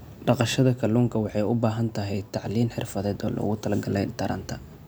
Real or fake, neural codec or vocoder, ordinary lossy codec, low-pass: real; none; none; none